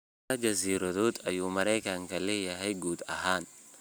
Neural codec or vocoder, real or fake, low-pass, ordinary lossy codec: none; real; none; none